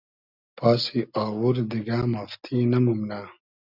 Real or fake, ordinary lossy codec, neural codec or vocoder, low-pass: real; Opus, 64 kbps; none; 5.4 kHz